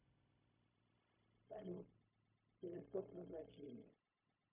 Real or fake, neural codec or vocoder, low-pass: fake; codec, 16 kHz, 0.4 kbps, LongCat-Audio-Codec; 3.6 kHz